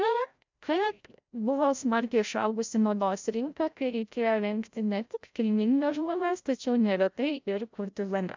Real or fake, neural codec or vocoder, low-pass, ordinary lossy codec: fake; codec, 16 kHz, 0.5 kbps, FreqCodec, larger model; 7.2 kHz; MP3, 64 kbps